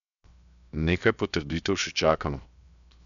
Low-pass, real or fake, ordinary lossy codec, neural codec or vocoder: 7.2 kHz; fake; none; codec, 16 kHz, 0.7 kbps, FocalCodec